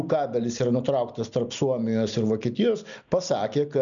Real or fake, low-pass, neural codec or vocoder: real; 7.2 kHz; none